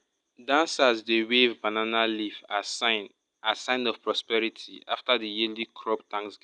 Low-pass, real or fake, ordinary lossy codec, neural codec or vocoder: 10.8 kHz; real; none; none